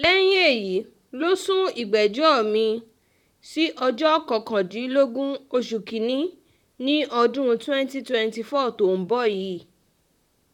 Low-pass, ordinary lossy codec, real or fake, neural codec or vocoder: 19.8 kHz; none; fake; vocoder, 44.1 kHz, 128 mel bands, Pupu-Vocoder